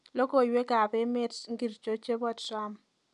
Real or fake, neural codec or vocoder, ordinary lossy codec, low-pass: real; none; none; 10.8 kHz